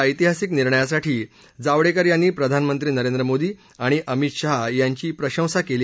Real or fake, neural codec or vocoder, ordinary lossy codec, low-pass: real; none; none; none